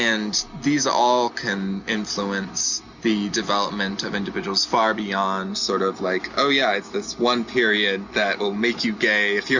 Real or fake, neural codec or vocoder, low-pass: real; none; 7.2 kHz